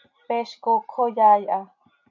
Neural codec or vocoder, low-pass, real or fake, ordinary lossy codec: none; 7.2 kHz; real; AAC, 48 kbps